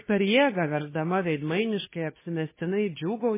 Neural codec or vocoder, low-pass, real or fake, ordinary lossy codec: codec, 16 kHz, 2 kbps, FunCodec, trained on Chinese and English, 25 frames a second; 3.6 kHz; fake; MP3, 16 kbps